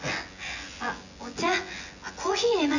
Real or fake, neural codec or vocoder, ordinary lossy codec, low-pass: fake; vocoder, 24 kHz, 100 mel bands, Vocos; none; 7.2 kHz